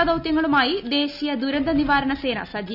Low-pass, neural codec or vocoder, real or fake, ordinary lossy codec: 5.4 kHz; none; real; none